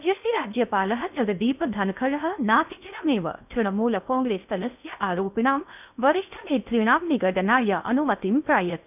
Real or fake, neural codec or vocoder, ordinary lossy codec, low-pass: fake; codec, 16 kHz in and 24 kHz out, 0.6 kbps, FocalCodec, streaming, 4096 codes; none; 3.6 kHz